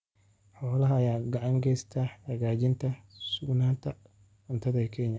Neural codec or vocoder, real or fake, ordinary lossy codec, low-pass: none; real; none; none